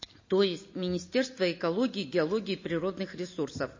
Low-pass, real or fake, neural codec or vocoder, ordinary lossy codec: 7.2 kHz; real; none; MP3, 32 kbps